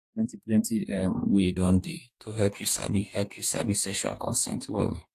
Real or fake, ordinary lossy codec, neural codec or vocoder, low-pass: fake; AAC, 64 kbps; codec, 44.1 kHz, 2.6 kbps, DAC; 14.4 kHz